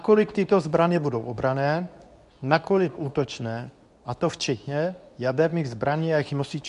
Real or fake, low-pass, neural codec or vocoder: fake; 10.8 kHz; codec, 24 kHz, 0.9 kbps, WavTokenizer, medium speech release version 2